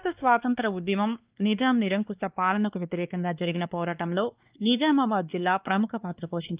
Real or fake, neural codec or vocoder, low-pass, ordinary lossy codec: fake; codec, 16 kHz, 2 kbps, X-Codec, WavLM features, trained on Multilingual LibriSpeech; 3.6 kHz; Opus, 32 kbps